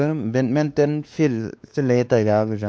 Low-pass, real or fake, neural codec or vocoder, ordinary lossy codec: none; fake; codec, 16 kHz, 2 kbps, X-Codec, WavLM features, trained on Multilingual LibriSpeech; none